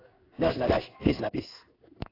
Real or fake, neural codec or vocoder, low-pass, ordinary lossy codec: fake; codec, 16 kHz in and 24 kHz out, 1 kbps, XY-Tokenizer; 5.4 kHz; AAC, 24 kbps